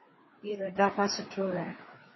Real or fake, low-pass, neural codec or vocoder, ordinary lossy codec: fake; 7.2 kHz; codec, 16 kHz, 4 kbps, FreqCodec, larger model; MP3, 24 kbps